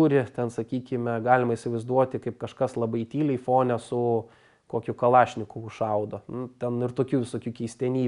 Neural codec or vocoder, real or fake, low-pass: none; real; 10.8 kHz